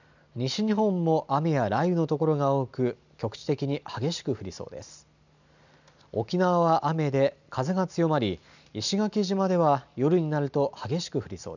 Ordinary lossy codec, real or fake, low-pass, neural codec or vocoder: none; real; 7.2 kHz; none